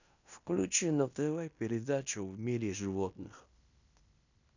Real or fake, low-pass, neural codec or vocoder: fake; 7.2 kHz; codec, 16 kHz in and 24 kHz out, 0.9 kbps, LongCat-Audio-Codec, fine tuned four codebook decoder